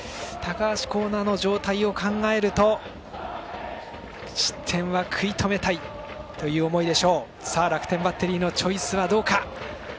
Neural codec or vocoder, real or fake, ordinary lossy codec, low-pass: none; real; none; none